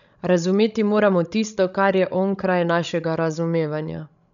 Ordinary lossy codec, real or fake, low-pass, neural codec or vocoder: none; fake; 7.2 kHz; codec, 16 kHz, 16 kbps, FreqCodec, larger model